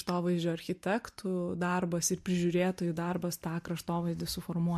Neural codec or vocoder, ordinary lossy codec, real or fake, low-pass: none; MP3, 64 kbps; real; 14.4 kHz